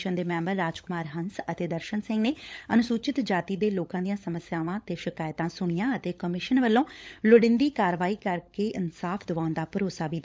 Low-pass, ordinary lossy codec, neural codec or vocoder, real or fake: none; none; codec, 16 kHz, 16 kbps, FunCodec, trained on LibriTTS, 50 frames a second; fake